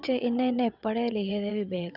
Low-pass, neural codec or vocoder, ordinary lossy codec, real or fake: 5.4 kHz; vocoder, 22.05 kHz, 80 mel bands, WaveNeXt; none; fake